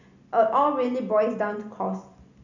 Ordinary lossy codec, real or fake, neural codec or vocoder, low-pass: none; real; none; 7.2 kHz